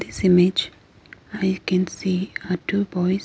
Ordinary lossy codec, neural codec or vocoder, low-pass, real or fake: none; none; none; real